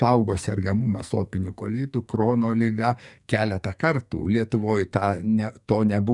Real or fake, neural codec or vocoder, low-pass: fake; codec, 44.1 kHz, 2.6 kbps, SNAC; 10.8 kHz